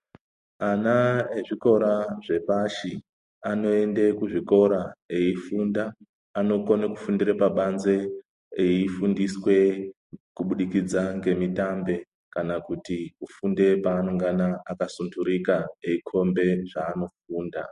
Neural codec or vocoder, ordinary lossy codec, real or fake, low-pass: none; MP3, 48 kbps; real; 14.4 kHz